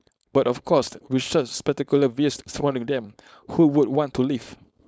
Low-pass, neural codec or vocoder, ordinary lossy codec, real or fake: none; codec, 16 kHz, 4.8 kbps, FACodec; none; fake